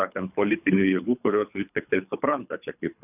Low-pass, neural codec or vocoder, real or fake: 3.6 kHz; codec, 24 kHz, 3 kbps, HILCodec; fake